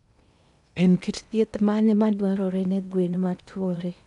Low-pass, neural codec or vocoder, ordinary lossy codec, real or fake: 10.8 kHz; codec, 16 kHz in and 24 kHz out, 0.8 kbps, FocalCodec, streaming, 65536 codes; none; fake